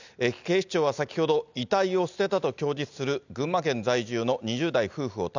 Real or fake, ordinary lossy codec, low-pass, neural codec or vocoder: real; none; 7.2 kHz; none